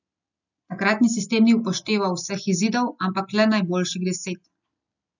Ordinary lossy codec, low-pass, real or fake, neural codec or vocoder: none; 7.2 kHz; real; none